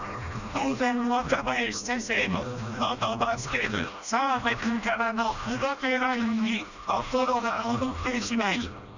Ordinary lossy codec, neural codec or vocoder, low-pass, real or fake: none; codec, 16 kHz, 1 kbps, FreqCodec, smaller model; 7.2 kHz; fake